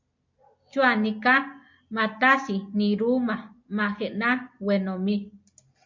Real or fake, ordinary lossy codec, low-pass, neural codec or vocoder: real; MP3, 48 kbps; 7.2 kHz; none